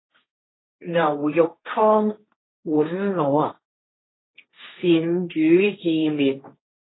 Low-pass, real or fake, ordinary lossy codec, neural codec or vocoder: 7.2 kHz; fake; AAC, 16 kbps; codec, 16 kHz, 1.1 kbps, Voila-Tokenizer